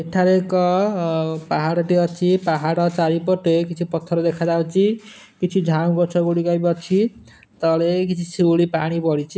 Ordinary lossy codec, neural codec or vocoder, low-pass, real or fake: none; none; none; real